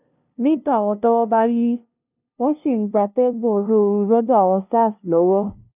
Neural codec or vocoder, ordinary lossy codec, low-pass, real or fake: codec, 16 kHz, 0.5 kbps, FunCodec, trained on LibriTTS, 25 frames a second; none; 3.6 kHz; fake